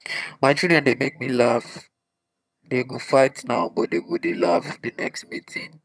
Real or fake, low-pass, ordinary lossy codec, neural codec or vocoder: fake; none; none; vocoder, 22.05 kHz, 80 mel bands, HiFi-GAN